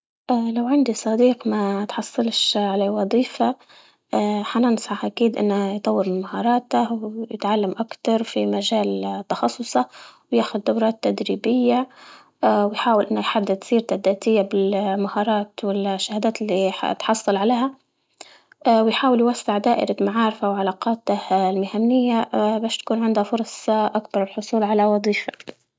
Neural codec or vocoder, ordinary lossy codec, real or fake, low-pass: none; none; real; none